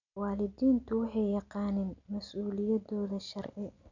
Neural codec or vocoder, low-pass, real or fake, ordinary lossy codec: none; 7.2 kHz; real; none